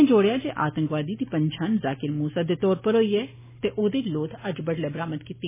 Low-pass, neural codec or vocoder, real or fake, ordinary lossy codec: 3.6 kHz; none; real; MP3, 16 kbps